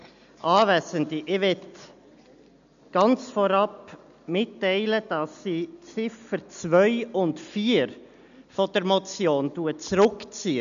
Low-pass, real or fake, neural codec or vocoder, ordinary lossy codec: 7.2 kHz; real; none; none